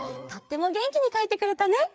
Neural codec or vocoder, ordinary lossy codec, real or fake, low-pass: codec, 16 kHz, 4 kbps, FreqCodec, larger model; none; fake; none